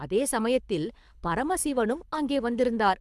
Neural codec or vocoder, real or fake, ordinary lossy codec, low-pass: codec, 24 kHz, 6 kbps, HILCodec; fake; none; none